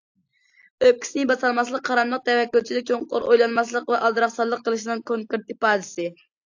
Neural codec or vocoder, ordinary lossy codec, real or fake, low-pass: none; AAC, 48 kbps; real; 7.2 kHz